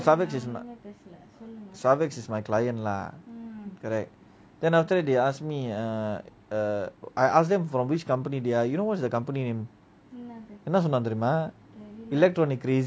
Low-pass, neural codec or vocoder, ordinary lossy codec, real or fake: none; none; none; real